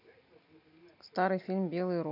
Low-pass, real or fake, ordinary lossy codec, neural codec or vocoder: 5.4 kHz; real; none; none